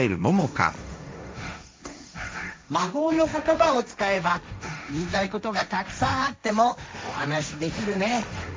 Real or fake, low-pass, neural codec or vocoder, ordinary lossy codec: fake; none; codec, 16 kHz, 1.1 kbps, Voila-Tokenizer; none